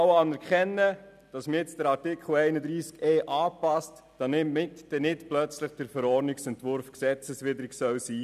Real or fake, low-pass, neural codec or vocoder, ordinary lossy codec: real; 14.4 kHz; none; none